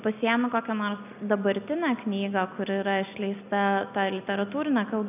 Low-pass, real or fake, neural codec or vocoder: 3.6 kHz; real; none